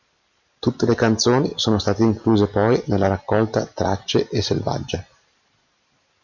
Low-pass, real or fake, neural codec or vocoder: 7.2 kHz; real; none